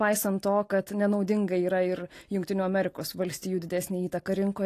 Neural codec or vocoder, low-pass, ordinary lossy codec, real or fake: none; 14.4 kHz; AAC, 48 kbps; real